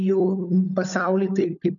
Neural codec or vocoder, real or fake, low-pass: codec, 16 kHz, 16 kbps, FunCodec, trained on LibriTTS, 50 frames a second; fake; 7.2 kHz